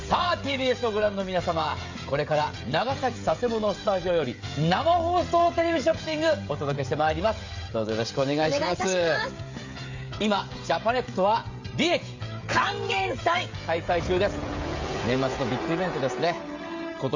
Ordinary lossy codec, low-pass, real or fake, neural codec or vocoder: MP3, 48 kbps; 7.2 kHz; fake; codec, 16 kHz, 16 kbps, FreqCodec, smaller model